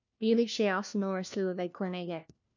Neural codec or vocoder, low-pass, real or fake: codec, 16 kHz, 1 kbps, FunCodec, trained on LibriTTS, 50 frames a second; 7.2 kHz; fake